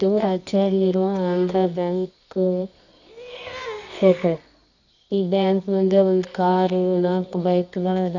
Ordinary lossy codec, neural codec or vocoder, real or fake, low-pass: none; codec, 24 kHz, 0.9 kbps, WavTokenizer, medium music audio release; fake; 7.2 kHz